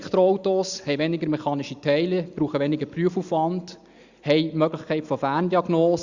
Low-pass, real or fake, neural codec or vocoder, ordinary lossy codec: 7.2 kHz; real; none; Opus, 64 kbps